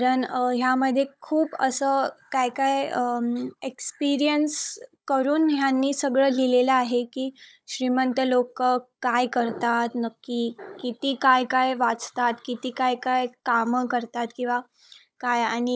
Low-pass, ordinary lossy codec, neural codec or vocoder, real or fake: none; none; codec, 16 kHz, 16 kbps, FunCodec, trained on Chinese and English, 50 frames a second; fake